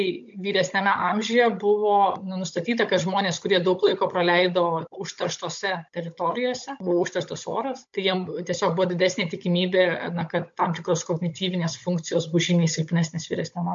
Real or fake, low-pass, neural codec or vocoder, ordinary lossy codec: fake; 7.2 kHz; codec, 16 kHz, 16 kbps, FunCodec, trained on Chinese and English, 50 frames a second; MP3, 48 kbps